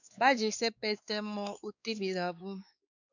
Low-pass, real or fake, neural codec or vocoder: 7.2 kHz; fake; codec, 16 kHz, 2 kbps, X-Codec, WavLM features, trained on Multilingual LibriSpeech